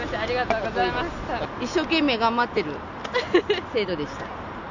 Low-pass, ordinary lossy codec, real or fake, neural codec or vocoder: 7.2 kHz; none; real; none